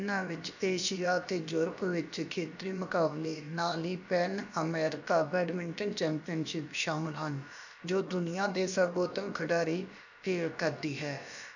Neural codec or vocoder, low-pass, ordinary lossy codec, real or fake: codec, 16 kHz, about 1 kbps, DyCAST, with the encoder's durations; 7.2 kHz; none; fake